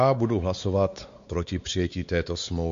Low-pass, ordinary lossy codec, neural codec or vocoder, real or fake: 7.2 kHz; MP3, 48 kbps; codec, 16 kHz, 4 kbps, X-Codec, WavLM features, trained on Multilingual LibriSpeech; fake